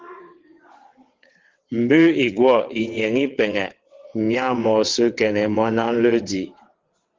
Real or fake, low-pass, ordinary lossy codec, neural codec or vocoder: fake; 7.2 kHz; Opus, 16 kbps; vocoder, 22.05 kHz, 80 mel bands, WaveNeXt